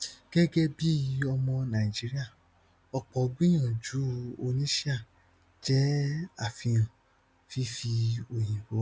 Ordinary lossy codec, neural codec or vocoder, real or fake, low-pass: none; none; real; none